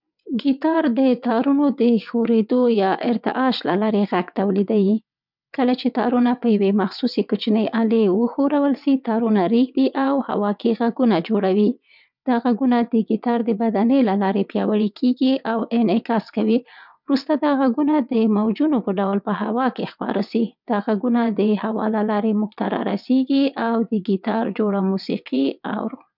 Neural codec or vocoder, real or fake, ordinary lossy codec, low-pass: vocoder, 22.05 kHz, 80 mel bands, WaveNeXt; fake; none; 5.4 kHz